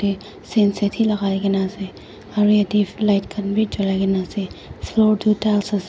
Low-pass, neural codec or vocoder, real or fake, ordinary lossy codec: none; none; real; none